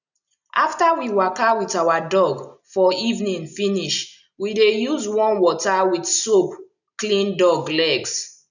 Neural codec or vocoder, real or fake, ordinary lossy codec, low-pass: none; real; none; 7.2 kHz